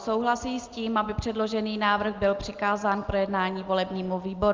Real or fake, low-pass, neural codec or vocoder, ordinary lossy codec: real; 7.2 kHz; none; Opus, 24 kbps